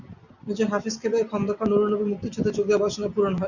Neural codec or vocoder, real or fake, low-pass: none; real; 7.2 kHz